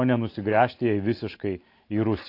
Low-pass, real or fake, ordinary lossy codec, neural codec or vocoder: 5.4 kHz; real; AAC, 32 kbps; none